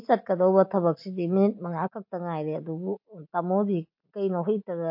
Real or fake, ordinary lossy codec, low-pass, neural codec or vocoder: real; MP3, 32 kbps; 5.4 kHz; none